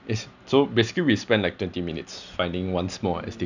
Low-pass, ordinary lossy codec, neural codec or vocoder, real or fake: 7.2 kHz; none; none; real